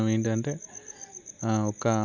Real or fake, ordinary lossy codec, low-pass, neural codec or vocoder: real; none; 7.2 kHz; none